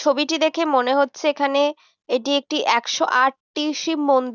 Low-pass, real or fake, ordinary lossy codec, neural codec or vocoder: 7.2 kHz; real; none; none